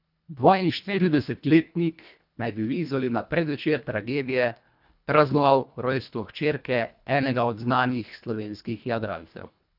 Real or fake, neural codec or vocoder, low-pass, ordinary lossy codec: fake; codec, 24 kHz, 1.5 kbps, HILCodec; 5.4 kHz; none